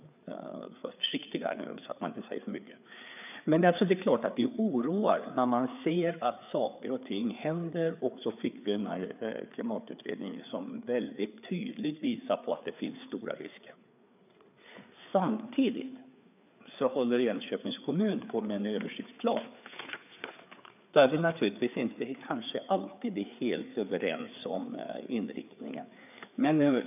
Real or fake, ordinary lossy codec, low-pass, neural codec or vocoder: fake; none; 3.6 kHz; codec, 16 kHz, 4 kbps, FreqCodec, larger model